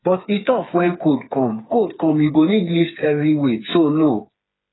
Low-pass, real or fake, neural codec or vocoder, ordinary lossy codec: 7.2 kHz; fake; codec, 16 kHz, 4 kbps, FreqCodec, smaller model; AAC, 16 kbps